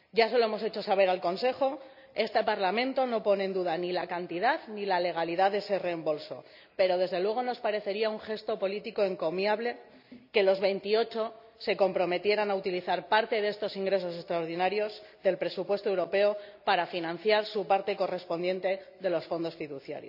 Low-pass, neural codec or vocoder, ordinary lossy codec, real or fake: 5.4 kHz; none; none; real